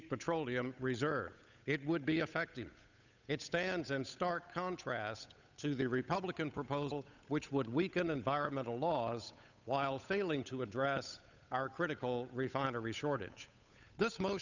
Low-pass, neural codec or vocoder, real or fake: 7.2 kHz; codec, 16 kHz, 8 kbps, FunCodec, trained on Chinese and English, 25 frames a second; fake